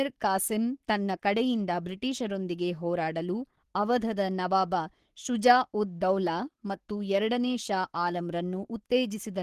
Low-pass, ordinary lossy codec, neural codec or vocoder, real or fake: 14.4 kHz; Opus, 16 kbps; codec, 44.1 kHz, 7.8 kbps, Pupu-Codec; fake